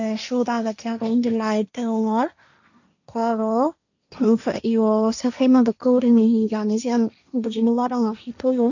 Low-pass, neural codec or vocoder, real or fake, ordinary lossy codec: 7.2 kHz; codec, 16 kHz, 1.1 kbps, Voila-Tokenizer; fake; none